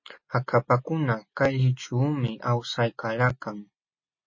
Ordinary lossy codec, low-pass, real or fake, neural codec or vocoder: MP3, 32 kbps; 7.2 kHz; real; none